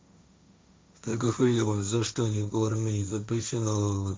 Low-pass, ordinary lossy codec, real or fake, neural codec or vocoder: none; none; fake; codec, 16 kHz, 1.1 kbps, Voila-Tokenizer